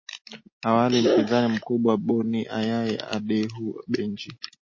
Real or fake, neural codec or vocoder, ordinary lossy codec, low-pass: real; none; MP3, 32 kbps; 7.2 kHz